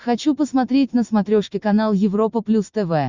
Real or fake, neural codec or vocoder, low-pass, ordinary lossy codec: real; none; 7.2 kHz; Opus, 64 kbps